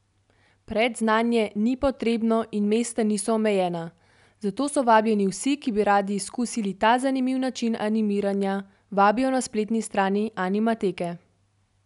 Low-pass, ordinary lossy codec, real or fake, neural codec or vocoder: 10.8 kHz; none; real; none